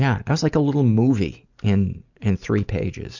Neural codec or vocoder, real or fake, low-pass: none; real; 7.2 kHz